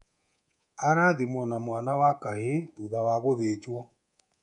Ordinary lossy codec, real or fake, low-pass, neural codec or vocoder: none; fake; 10.8 kHz; codec, 24 kHz, 3.1 kbps, DualCodec